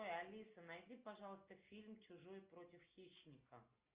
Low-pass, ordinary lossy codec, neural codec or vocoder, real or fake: 3.6 kHz; Opus, 32 kbps; none; real